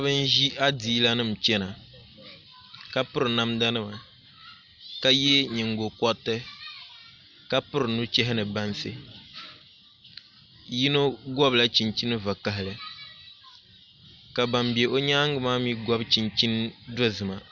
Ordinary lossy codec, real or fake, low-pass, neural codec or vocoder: Opus, 64 kbps; real; 7.2 kHz; none